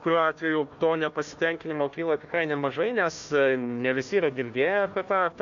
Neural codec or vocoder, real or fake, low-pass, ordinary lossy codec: codec, 16 kHz, 1 kbps, FunCodec, trained on Chinese and English, 50 frames a second; fake; 7.2 kHz; AAC, 64 kbps